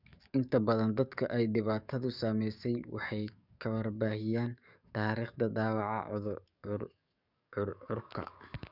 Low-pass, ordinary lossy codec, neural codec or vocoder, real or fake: 5.4 kHz; none; codec, 44.1 kHz, 7.8 kbps, DAC; fake